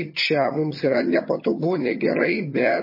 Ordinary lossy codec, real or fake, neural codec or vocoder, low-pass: MP3, 24 kbps; fake; vocoder, 22.05 kHz, 80 mel bands, HiFi-GAN; 5.4 kHz